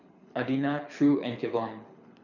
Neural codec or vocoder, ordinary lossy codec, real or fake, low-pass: codec, 24 kHz, 6 kbps, HILCodec; none; fake; 7.2 kHz